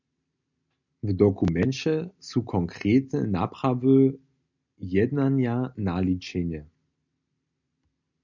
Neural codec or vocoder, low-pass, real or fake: none; 7.2 kHz; real